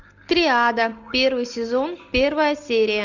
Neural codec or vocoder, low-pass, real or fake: none; 7.2 kHz; real